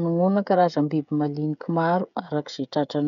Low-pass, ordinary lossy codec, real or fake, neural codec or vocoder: 7.2 kHz; none; fake; codec, 16 kHz, 16 kbps, FreqCodec, smaller model